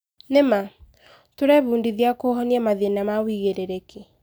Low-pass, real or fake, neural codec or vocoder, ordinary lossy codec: none; real; none; none